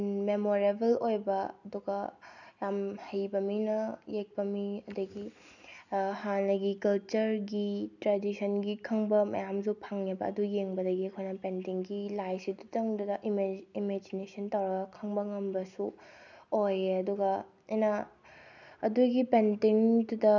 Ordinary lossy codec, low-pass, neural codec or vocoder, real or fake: none; 7.2 kHz; none; real